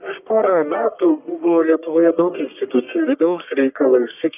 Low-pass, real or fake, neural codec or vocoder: 3.6 kHz; fake; codec, 44.1 kHz, 1.7 kbps, Pupu-Codec